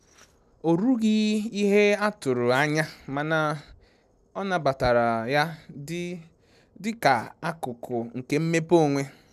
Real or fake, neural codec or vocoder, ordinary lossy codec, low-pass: real; none; none; 14.4 kHz